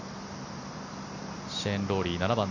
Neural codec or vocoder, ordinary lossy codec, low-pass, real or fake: none; none; 7.2 kHz; real